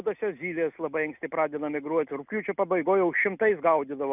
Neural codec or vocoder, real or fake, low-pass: none; real; 3.6 kHz